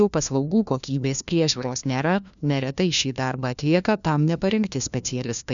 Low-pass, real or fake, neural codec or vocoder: 7.2 kHz; fake; codec, 16 kHz, 1 kbps, FunCodec, trained on LibriTTS, 50 frames a second